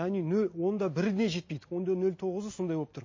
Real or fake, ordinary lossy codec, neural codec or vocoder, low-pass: real; MP3, 32 kbps; none; 7.2 kHz